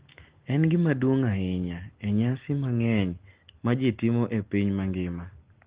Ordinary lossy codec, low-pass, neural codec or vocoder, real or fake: Opus, 16 kbps; 3.6 kHz; none; real